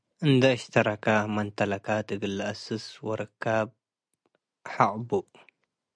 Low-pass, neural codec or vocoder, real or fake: 9.9 kHz; none; real